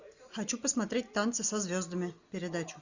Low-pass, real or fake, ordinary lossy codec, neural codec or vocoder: 7.2 kHz; real; Opus, 64 kbps; none